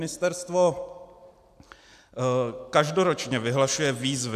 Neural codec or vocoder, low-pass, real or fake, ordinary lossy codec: none; 14.4 kHz; real; AAC, 96 kbps